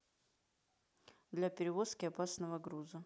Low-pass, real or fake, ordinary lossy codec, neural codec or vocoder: none; real; none; none